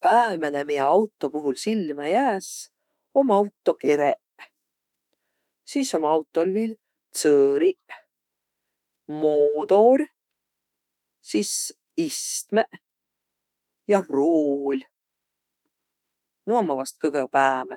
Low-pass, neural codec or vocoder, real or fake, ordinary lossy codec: 19.8 kHz; autoencoder, 48 kHz, 32 numbers a frame, DAC-VAE, trained on Japanese speech; fake; none